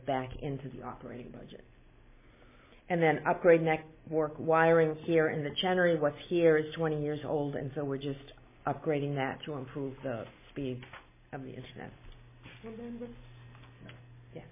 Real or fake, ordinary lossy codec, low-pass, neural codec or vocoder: real; MP3, 16 kbps; 3.6 kHz; none